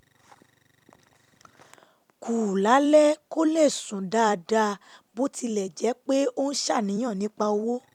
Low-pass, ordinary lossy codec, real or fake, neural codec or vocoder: 19.8 kHz; none; fake; vocoder, 44.1 kHz, 128 mel bands every 256 samples, BigVGAN v2